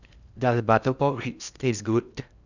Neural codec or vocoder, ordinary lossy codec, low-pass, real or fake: codec, 16 kHz in and 24 kHz out, 0.8 kbps, FocalCodec, streaming, 65536 codes; none; 7.2 kHz; fake